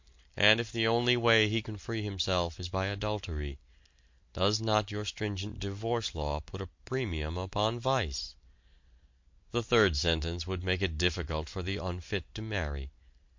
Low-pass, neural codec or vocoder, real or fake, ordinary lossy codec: 7.2 kHz; none; real; MP3, 48 kbps